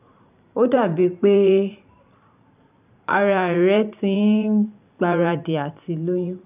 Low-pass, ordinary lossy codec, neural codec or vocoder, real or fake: 3.6 kHz; none; vocoder, 44.1 kHz, 128 mel bands every 512 samples, BigVGAN v2; fake